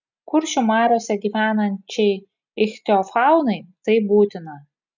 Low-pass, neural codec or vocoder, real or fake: 7.2 kHz; none; real